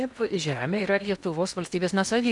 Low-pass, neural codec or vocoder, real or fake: 10.8 kHz; codec, 16 kHz in and 24 kHz out, 0.8 kbps, FocalCodec, streaming, 65536 codes; fake